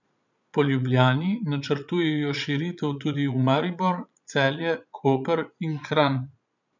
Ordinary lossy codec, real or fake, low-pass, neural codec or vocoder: none; fake; 7.2 kHz; vocoder, 22.05 kHz, 80 mel bands, Vocos